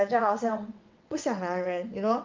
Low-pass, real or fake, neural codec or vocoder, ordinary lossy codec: 7.2 kHz; fake; vocoder, 22.05 kHz, 80 mel bands, WaveNeXt; Opus, 24 kbps